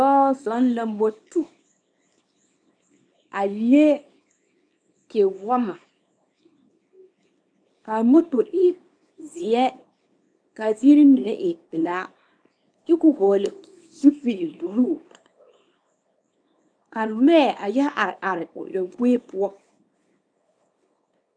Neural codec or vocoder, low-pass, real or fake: codec, 24 kHz, 0.9 kbps, WavTokenizer, small release; 9.9 kHz; fake